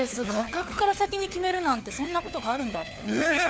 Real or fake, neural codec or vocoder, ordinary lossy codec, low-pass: fake; codec, 16 kHz, 4 kbps, FunCodec, trained on LibriTTS, 50 frames a second; none; none